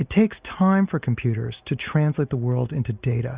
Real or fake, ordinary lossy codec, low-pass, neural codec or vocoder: real; Opus, 64 kbps; 3.6 kHz; none